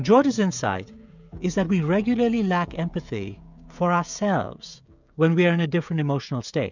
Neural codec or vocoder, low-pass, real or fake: codec, 16 kHz, 16 kbps, FreqCodec, smaller model; 7.2 kHz; fake